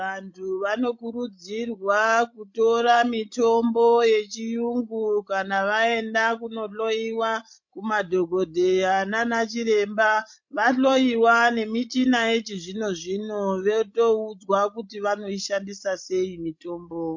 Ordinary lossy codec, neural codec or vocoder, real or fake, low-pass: MP3, 48 kbps; codec, 16 kHz, 16 kbps, FreqCodec, larger model; fake; 7.2 kHz